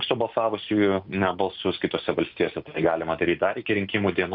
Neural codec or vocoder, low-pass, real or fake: none; 5.4 kHz; real